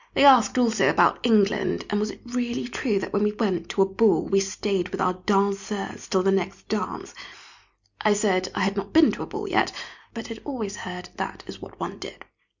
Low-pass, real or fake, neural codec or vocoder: 7.2 kHz; real; none